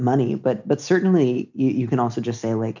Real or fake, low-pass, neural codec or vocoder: real; 7.2 kHz; none